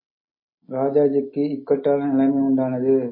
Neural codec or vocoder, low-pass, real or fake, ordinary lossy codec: none; 5.4 kHz; real; MP3, 24 kbps